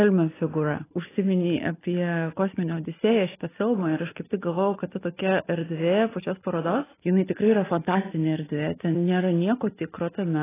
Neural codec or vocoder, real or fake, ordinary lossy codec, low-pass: none; real; AAC, 16 kbps; 3.6 kHz